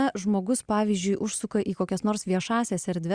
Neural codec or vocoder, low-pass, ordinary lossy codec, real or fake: none; 9.9 kHz; Opus, 64 kbps; real